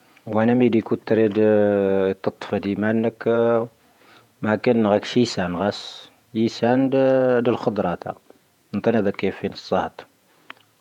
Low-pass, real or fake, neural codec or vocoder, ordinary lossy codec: 19.8 kHz; fake; vocoder, 48 kHz, 128 mel bands, Vocos; none